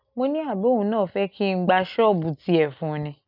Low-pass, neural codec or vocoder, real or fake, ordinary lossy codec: 5.4 kHz; none; real; none